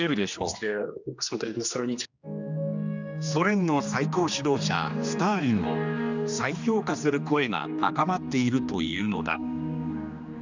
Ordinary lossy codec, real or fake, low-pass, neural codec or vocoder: none; fake; 7.2 kHz; codec, 16 kHz, 2 kbps, X-Codec, HuBERT features, trained on general audio